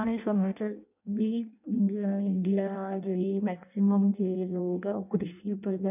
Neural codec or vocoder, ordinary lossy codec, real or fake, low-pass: codec, 16 kHz in and 24 kHz out, 0.6 kbps, FireRedTTS-2 codec; none; fake; 3.6 kHz